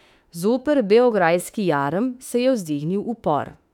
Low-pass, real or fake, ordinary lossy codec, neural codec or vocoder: 19.8 kHz; fake; none; autoencoder, 48 kHz, 32 numbers a frame, DAC-VAE, trained on Japanese speech